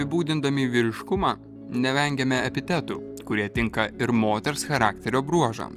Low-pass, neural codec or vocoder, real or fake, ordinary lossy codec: 14.4 kHz; none; real; Opus, 32 kbps